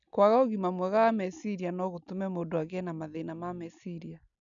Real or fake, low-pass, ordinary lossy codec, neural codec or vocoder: real; 7.2 kHz; none; none